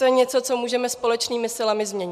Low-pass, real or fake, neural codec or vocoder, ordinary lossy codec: 14.4 kHz; real; none; MP3, 96 kbps